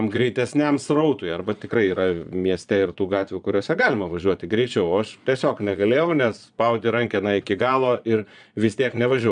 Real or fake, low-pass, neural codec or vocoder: fake; 9.9 kHz; vocoder, 22.05 kHz, 80 mel bands, Vocos